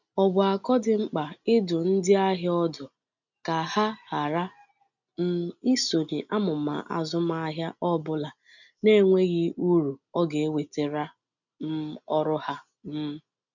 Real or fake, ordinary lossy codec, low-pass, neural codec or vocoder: real; none; 7.2 kHz; none